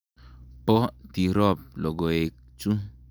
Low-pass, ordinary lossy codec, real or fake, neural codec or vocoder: none; none; real; none